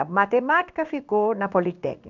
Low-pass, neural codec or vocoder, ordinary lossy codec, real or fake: 7.2 kHz; codec, 16 kHz in and 24 kHz out, 1 kbps, XY-Tokenizer; none; fake